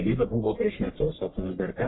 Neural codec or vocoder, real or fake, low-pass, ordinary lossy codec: codec, 44.1 kHz, 1.7 kbps, Pupu-Codec; fake; 7.2 kHz; AAC, 16 kbps